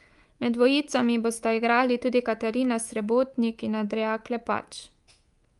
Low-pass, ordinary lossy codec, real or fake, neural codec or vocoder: 10.8 kHz; Opus, 32 kbps; fake; codec, 24 kHz, 3.1 kbps, DualCodec